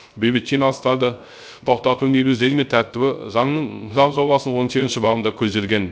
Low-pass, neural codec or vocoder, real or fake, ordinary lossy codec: none; codec, 16 kHz, 0.3 kbps, FocalCodec; fake; none